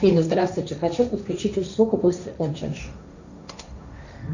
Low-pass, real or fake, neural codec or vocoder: 7.2 kHz; fake; codec, 16 kHz, 1.1 kbps, Voila-Tokenizer